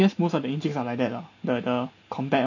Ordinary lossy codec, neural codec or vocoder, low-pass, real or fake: none; none; 7.2 kHz; real